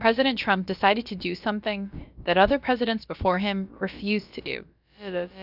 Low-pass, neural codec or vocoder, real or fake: 5.4 kHz; codec, 16 kHz, about 1 kbps, DyCAST, with the encoder's durations; fake